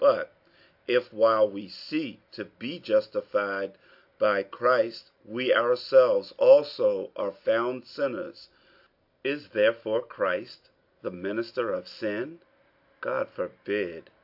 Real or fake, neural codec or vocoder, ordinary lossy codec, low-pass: real; none; AAC, 48 kbps; 5.4 kHz